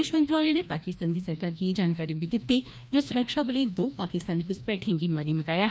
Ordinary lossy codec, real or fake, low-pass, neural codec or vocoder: none; fake; none; codec, 16 kHz, 1 kbps, FreqCodec, larger model